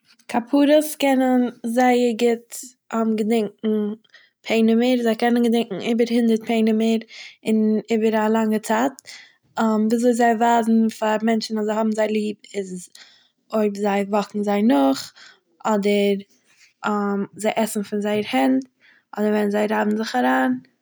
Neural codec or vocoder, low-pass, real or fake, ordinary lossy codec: none; none; real; none